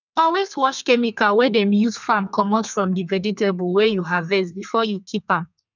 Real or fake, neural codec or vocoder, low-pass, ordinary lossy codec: fake; codec, 32 kHz, 1.9 kbps, SNAC; 7.2 kHz; none